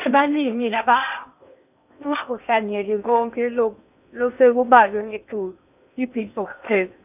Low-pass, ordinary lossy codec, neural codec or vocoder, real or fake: 3.6 kHz; none; codec, 16 kHz in and 24 kHz out, 0.8 kbps, FocalCodec, streaming, 65536 codes; fake